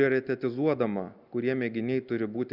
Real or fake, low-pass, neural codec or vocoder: real; 5.4 kHz; none